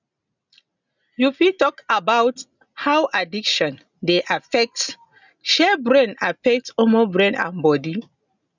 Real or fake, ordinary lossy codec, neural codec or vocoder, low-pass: real; none; none; 7.2 kHz